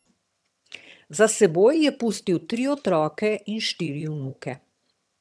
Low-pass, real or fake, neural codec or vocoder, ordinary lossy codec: none; fake; vocoder, 22.05 kHz, 80 mel bands, HiFi-GAN; none